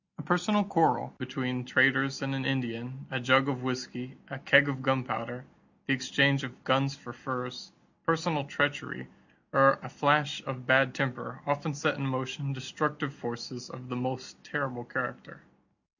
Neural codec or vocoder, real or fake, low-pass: none; real; 7.2 kHz